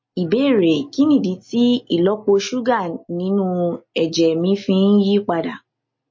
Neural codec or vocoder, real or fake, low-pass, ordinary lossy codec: none; real; 7.2 kHz; MP3, 32 kbps